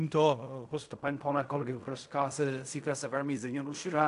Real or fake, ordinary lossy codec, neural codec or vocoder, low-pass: fake; AAC, 64 kbps; codec, 16 kHz in and 24 kHz out, 0.4 kbps, LongCat-Audio-Codec, fine tuned four codebook decoder; 10.8 kHz